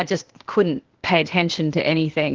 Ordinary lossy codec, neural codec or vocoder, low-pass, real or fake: Opus, 16 kbps; codec, 16 kHz, 0.8 kbps, ZipCodec; 7.2 kHz; fake